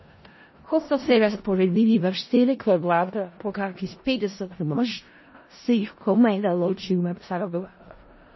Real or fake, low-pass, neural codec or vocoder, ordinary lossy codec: fake; 7.2 kHz; codec, 16 kHz in and 24 kHz out, 0.4 kbps, LongCat-Audio-Codec, four codebook decoder; MP3, 24 kbps